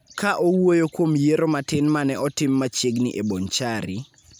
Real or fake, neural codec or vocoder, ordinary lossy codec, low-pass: real; none; none; none